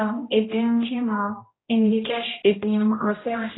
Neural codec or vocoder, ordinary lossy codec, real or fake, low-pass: codec, 16 kHz, 0.5 kbps, X-Codec, HuBERT features, trained on general audio; AAC, 16 kbps; fake; 7.2 kHz